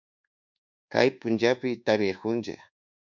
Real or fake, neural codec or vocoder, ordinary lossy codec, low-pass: fake; codec, 24 kHz, 1.2 kbps, DualCodec; MP3, 64 kbps; 7.2 kHz